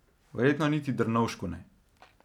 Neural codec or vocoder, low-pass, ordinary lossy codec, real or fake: none; 19.8 kHz; none; real